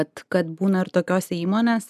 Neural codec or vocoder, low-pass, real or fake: none; 14.4 kHz; real